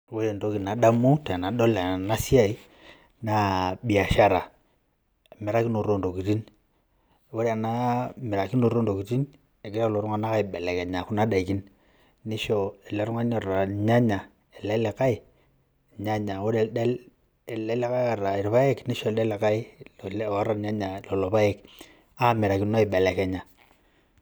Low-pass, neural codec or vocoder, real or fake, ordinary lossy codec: none; none; real; none